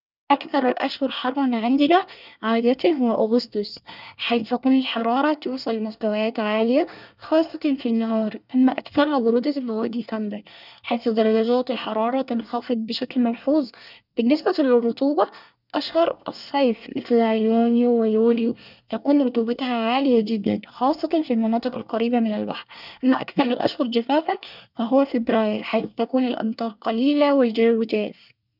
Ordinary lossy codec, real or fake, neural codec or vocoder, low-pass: none; fake; codec, 24 kHz, 1 kbps, SNAC; 5.4 kHz